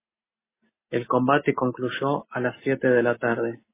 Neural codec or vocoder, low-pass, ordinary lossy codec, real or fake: none; 3.6 kHz; MP3, 16 kbps; real